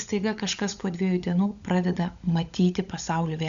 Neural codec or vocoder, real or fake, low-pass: codec, 16 kHz, 16 kbps, FunCodec, trained on LibriTTS, 50 frames a second; fake; 7.2 kHz